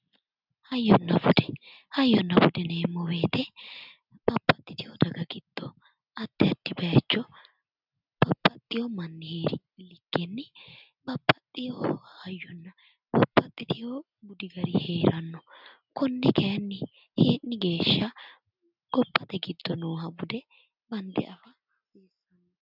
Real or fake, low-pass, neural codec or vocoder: real; 5.4 kHz; none